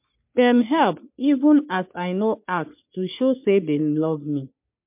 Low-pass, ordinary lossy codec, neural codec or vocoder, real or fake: 3.6 kHz; MP3, 32 kbps; codec, 44.1 kHz, 3.4 kbps, Pupu-Codec; fake